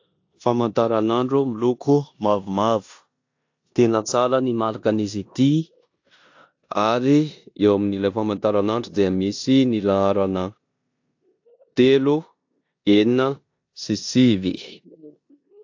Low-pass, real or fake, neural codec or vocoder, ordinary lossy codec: 7.2 kHz; fake; codec, 16 kHz in and 24 kHz out, 0.9 kbps, LongCat-Audio-Codec, four codebook decoder; AAC, 48 kbps